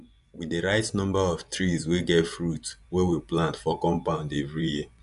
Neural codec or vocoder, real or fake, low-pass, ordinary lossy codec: vocoder, 24 kHz, 100 mel bands, Vocos; fake; 10.8 kHz; none